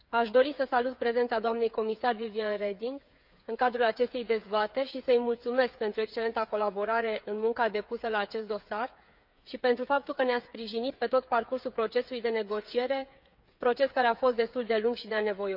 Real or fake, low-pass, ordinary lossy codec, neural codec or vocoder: fake; 5.4 kHz; none; codec, 16 kHz, 8 kbps, FreqCodec, smaller model